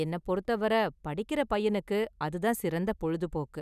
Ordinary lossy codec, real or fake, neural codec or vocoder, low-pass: none; real; none; 14.4 kHz